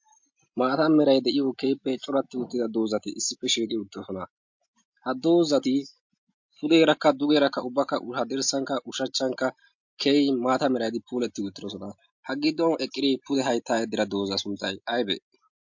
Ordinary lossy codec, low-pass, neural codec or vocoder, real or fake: MP3, 48 kbps; 7.2 kHz; none; real